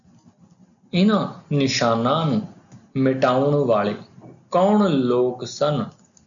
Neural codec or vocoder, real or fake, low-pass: none; real; 7.2 kHz